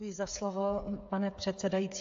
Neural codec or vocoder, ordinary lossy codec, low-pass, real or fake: codec, 16 kHz, 8 kbps, FreqCodec, smaller model; AAC, 48 kbps; 7.2 kHz; fake